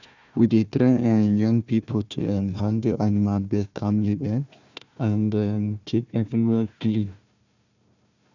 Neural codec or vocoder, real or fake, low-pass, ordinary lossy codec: codec, 16 kHz, 1 kbps, FunCodec, trained on Chinese and English, 50 frames a second; fake; 7.2 kHz; none